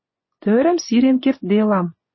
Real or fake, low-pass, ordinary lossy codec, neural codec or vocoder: fake; 7.2 kHz; MP3, 24 kbps; vocoder, 24 kHz, 100 mel bands, Vocos